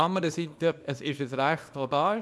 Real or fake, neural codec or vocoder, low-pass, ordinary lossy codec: fake; codec, 24 kHz, 0.9 kbps, WavTokenizer, small release; none; none